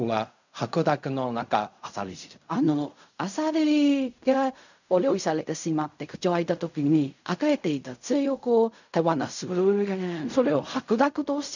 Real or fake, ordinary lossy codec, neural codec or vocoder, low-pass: fake; none; codec, 16 kHz in and 24 kHz out, 0.4 kbps, LongCat-Audio-Codec, fine tuned four codebook decoder; 7.2 kHz